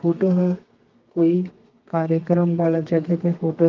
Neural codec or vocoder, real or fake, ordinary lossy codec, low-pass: codec, 32 kHz, 1.9 kbps, SNAC; fake; Opus, 32 kbps; 7.2 kHz